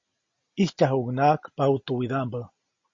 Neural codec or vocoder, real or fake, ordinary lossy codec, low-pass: none; real; MP3, 32 kbps; 7.2 kHz